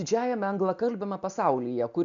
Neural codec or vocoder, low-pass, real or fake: none; 7.2 kHz; real